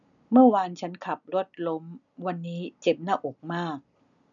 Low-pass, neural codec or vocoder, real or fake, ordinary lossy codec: 7.2 kHz; none; real; none